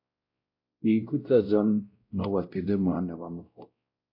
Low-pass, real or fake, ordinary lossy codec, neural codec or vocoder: 5.4 kHz; fake; AAC, 48 kbps; codec, 16 kHz, 0.5 kbps, X-Codec, WavLM features, trained on Multilingual LibriSpeech